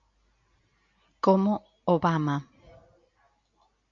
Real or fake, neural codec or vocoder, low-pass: real; none; 7.2 kHz